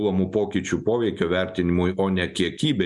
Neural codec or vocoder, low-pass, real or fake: none; 10.8 kHz; real